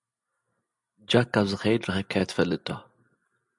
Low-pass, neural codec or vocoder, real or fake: 10.8 kHz; none; real